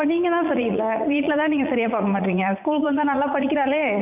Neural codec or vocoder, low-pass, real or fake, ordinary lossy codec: vocoder, 44.1 kHz, 80 mel bands, Vocos; 3.6 kHz; fake; none